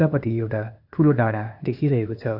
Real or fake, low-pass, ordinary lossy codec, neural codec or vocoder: fake; 5.4 kHz; AAC, 48 kbps; codec, 16 kHz, 0.8 kbps, ZipCodec